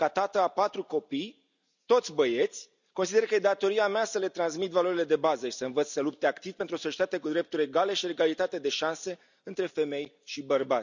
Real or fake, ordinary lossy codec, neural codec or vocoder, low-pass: real; none; none; 7.2 kHz